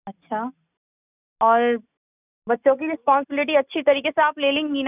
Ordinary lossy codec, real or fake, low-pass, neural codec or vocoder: none; real; 3.6 kHz; none